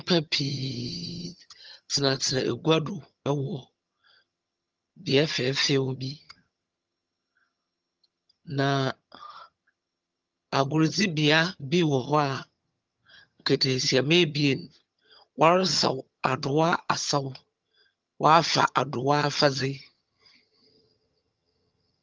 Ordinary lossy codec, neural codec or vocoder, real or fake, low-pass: Opus, 24 kbps; vocoder, 22.05 kHz, 80 mel bands, HiFi-GAN; fake; 7.2 kHz